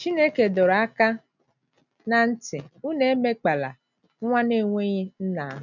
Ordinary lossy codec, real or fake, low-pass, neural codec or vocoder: none; real; 7.2 kHz; none